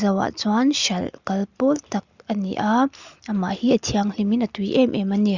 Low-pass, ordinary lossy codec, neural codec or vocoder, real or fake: 7.2 kHz; Opus, 64 kbps; none; real